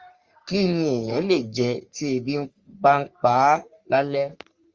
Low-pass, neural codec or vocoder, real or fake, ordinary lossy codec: 7.2 kHz; codec, 44.1 kHz, 3.4 kbps, Pupu-Codec; fake; Opus, 32 kbps